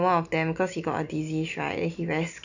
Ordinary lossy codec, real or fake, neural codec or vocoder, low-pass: none; real; none; 7.2 kHz